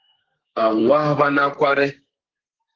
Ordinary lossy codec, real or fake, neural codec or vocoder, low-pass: Opus, 16 kbps; fake; codec, 32 kHz, 1.9 kbps, SNAC; 7.2 kHz